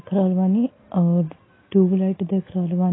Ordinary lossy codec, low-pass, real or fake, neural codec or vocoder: AAC, 16 kbps; 7.2 kHz; real; none